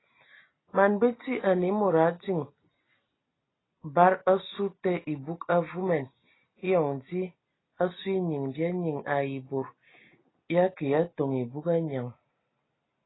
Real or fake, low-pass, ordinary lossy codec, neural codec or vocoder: real; 7.2 kHz; AAC, 16 kbps; none